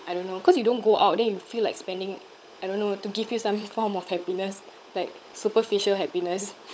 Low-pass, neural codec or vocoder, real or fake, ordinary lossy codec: none; codec, 16 kHz, 16 kbps, FunCodec, trained on LibriTTS, 50 frames a second; fake; none